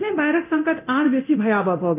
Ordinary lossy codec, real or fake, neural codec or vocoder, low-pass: none; fake; codec, 24 kHz, 0.9 kbps, DualCodec; 3.6 kHz